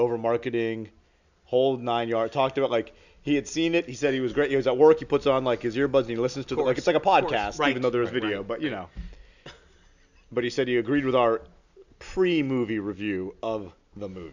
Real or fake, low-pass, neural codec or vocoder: real; 7.2 kHz; none